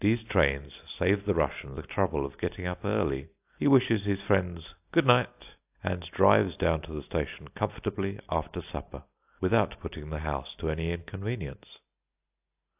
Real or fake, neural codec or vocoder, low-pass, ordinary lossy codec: real; none; 3.6 kHz; AAC, 32 kbps